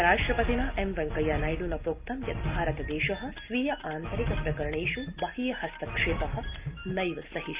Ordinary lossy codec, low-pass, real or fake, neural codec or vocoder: Opus, 32 kbps; 3.6 kHz; real; none